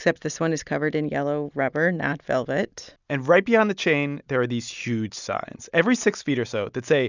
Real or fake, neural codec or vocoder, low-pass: real; none; 7.2 kHz